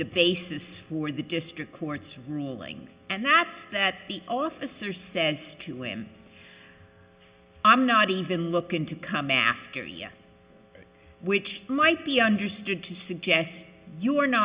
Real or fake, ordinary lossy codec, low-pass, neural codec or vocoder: real; Opus, 64 kbps; 3.6 kHz; none